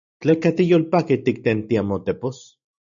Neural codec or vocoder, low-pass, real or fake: none; 7.2 kHz; real